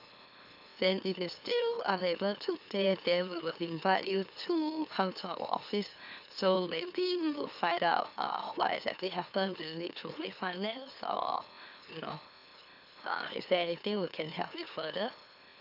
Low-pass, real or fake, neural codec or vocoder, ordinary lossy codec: 5.4 kHz; fake; autoencoder, 44.1 kHz, a latent of 192 numbers a frame, MeloTTS; none